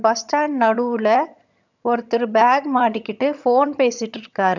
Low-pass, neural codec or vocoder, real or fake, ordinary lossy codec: 7.2 kHz; vocoder, 22.05 kHz, 80 mel bands, HiFi-GAN; fake; none